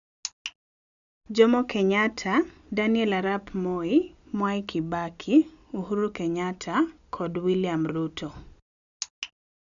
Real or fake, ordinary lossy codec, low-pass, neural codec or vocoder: real; none; 7.2 kHz; none